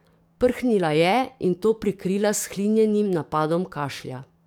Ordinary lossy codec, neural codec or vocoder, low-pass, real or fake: none; codec, 44.1 kHz, 7.8 kbps, DAC; 19.8 kHz; fake